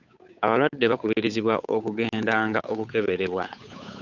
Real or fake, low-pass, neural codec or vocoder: fake; 7.2 kHz; codec, 16 kHz, 8 kbps, FunCodec, trained on Chinese and English, 25 frames a second